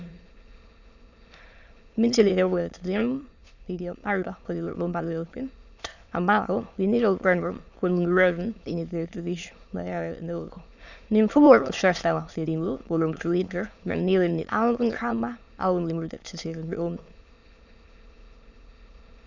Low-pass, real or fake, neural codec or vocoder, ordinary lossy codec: 7.2 kHz; fake; autoencoder, 22.05 kHz, a latent of 192 numbers a frame, VITS, trained on many speakers; Opus, 64 kbps